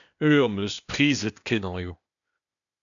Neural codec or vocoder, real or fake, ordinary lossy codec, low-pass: codec, 16 kHz, 0.8 kbps, ZipCodec; fake; MP3, 96 kbps; 7.2 kHz